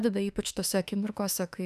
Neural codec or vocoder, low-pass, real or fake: autoencoder, 48 kHz, 32 numbers a frame, DAC-VAE, trained on Japanese speech; 14.4 kHz; fake